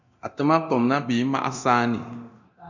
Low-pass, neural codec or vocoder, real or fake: 7.2 kHz; codec, 24 kHz, 0.9 kbps, DualCodec; fake